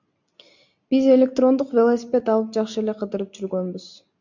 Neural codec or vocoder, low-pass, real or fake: none; 7.2 kHz; real